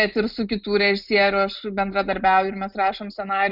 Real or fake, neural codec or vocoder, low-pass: real; none; 5.4 kHz